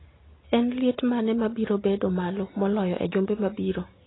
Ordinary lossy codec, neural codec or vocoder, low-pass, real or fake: AAC, 16 kbps; none; 7.2 kHz; real